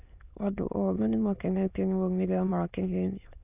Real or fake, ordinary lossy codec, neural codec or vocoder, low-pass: fake; none; autoencoder, 22.05 kHz, a latent of 192 numbers a frame, VITS, trained on many speakers; 3.6 kHz